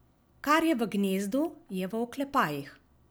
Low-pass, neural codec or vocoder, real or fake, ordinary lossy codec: none; none; real; none